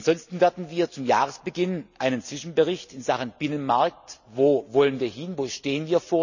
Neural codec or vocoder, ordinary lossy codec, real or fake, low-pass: none; none; real; 7.2 kHz